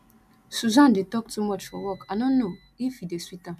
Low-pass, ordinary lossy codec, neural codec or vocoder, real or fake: 14.4 kHz; none; none; real